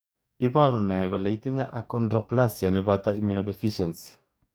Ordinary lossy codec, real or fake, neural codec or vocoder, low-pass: none; fake; codec, 44.1 kHz, 2.6 kbps, DAC; none